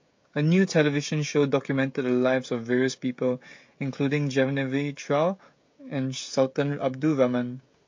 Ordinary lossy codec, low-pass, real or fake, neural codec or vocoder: MP3, 48 kbps; 7.2 kHz; fake; codec, 16 kHz, 16 kbps, FreqCodec, smaller model